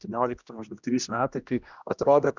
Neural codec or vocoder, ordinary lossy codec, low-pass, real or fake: codec, 16 kHz, 1 kbps, X-Codec, HuBERT features, trained on general audio; Opus, 64 kbps; 7.2 kHz; fake